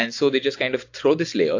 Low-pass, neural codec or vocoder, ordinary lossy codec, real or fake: 7.2 kHz; none; AAC, 48 kbps; real